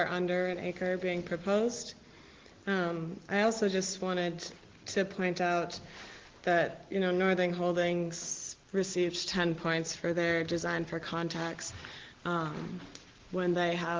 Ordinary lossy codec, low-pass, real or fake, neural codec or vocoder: Opus, 16 kbps; 7.2 kHz; real; none